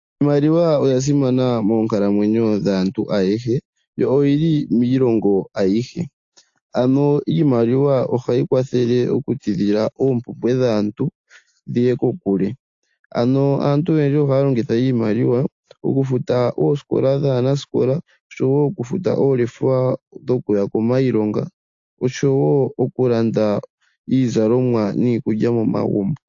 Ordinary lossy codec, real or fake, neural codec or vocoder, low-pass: AAC, 48 kbps; real; none; 7.2 kHz